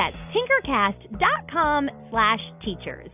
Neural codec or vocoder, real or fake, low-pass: none; real; 3.6 kHz